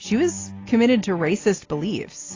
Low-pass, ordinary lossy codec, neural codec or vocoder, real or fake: 7.2 kHz; AAC, 32 kbps; none; real